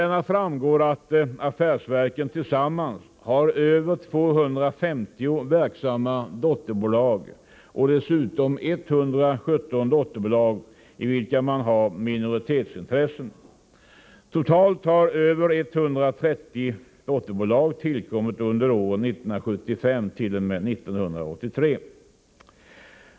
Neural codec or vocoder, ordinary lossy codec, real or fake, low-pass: none; none; real; none